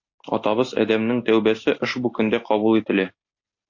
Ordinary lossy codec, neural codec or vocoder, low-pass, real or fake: AAC, 48 kbps; none; 7.2 kHz; real